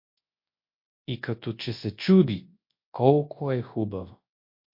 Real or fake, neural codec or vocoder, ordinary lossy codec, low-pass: fake; codec, 24 kHz, 0.9 kbps, WavTokenizer, large speech release; MP3, 32 kbps; 5.4 kHz